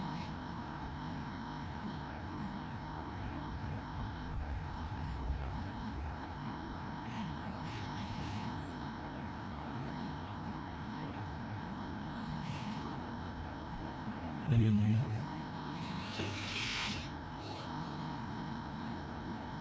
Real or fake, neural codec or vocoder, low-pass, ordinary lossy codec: fake; codec, 16 kHz, 1 kbps, FreqCodec, larger model; none; none